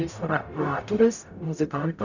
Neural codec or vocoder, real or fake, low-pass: codec, 44.1 kHz, 0.9 kbps, DAC; fake; 7.2 kHz